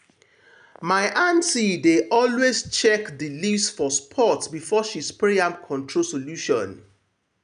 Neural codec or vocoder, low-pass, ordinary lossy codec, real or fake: none; 9.9 kHz; none; real